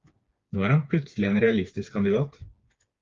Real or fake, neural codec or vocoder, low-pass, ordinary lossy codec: fake; codec, 16 kHz, 4 kbps, FreqCodec, smaller model; 7.2 kHz; Opus, 32 kbps